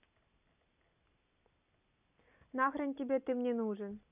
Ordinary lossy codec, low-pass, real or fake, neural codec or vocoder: none; 3.6 kHz; real; none